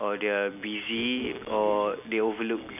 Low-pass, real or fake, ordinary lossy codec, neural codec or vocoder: 3.6 kHz; real; none; none